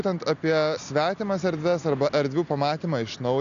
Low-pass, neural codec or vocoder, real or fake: 7.2 kHz; none; real